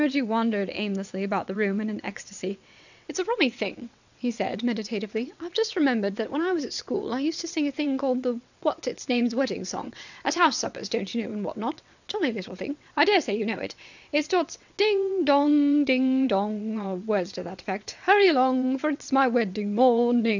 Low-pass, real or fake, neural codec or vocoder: 7.2 kHz; fake; vocoder, 22.05 kHz, 80 mel bands, WaveNeXt